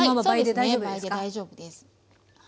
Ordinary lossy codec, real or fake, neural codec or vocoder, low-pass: none; real; none; none